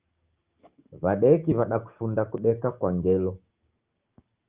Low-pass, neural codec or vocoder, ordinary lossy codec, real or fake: 3.6 kHz; codec, 16 kHz, 6 kbps, DAC; Opus, 32 kbps; fake